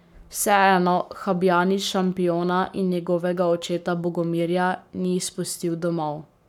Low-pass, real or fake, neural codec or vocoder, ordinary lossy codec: 19.8 kHz; fake; codec, 44.1 kHz, 7.8 kbps, Pupu-Codec; none